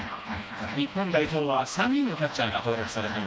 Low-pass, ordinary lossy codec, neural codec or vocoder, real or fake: none; none; codec, 16 kHz, 1 kbps, FreqCodec, smaller model; fake